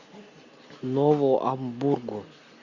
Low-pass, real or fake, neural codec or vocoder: 7.2 kHz; real; none